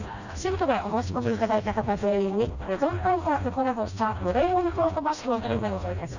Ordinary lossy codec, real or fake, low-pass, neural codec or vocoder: none; fake; 7.2 kHz; codec, 16 kHz, 1 kbps, FreqCodec, smaller model